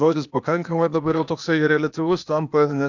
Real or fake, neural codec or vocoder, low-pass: fake; codec, 16 kHz, 0.8 kbps, ZipCodec; 7.2 kHz